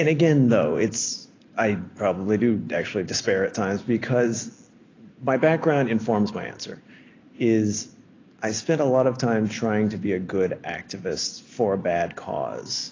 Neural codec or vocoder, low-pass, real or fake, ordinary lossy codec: none; 7.2 kHz; real; AAC, 32 kbps